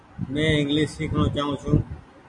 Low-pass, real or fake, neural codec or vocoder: 10.8 kHz; real; none